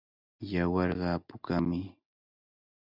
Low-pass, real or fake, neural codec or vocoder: 5.4 kHz; real; none